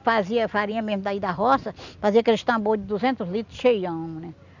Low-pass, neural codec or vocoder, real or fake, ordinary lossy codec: 7.2 kHz; none; real; none